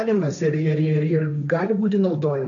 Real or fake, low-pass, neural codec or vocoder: fake; 7.2 kHz; codec, 16 kHz, 1.1 kbps, Voila-Tokenizer